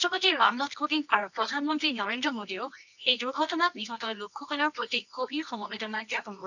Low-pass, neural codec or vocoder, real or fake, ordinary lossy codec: 7.2 kHz; codec, 24 kHz, 0.9 kbps, WavTokenizer, medium music audio release; fake; none